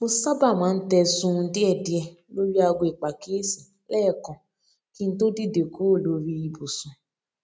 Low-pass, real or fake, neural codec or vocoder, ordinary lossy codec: none; real; none; none